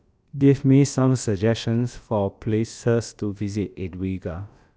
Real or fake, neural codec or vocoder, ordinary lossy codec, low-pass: fake; codec, 16 kHz, about 1 kbps, DyCAST, with the encoder's durations; none; none